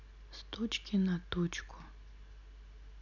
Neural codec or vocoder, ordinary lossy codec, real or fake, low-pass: none; none; real; 7.2 kHz